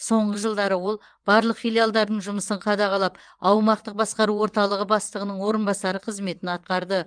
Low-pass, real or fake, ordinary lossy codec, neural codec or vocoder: 9.9 kHz; fake; Opus, 32 kbps; vocoder, 22.05 kHz, 80 mel bands, WaveNeXt